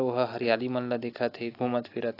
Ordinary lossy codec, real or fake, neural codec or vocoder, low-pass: AAC, 32 kbps; real; none; 5.4 kHz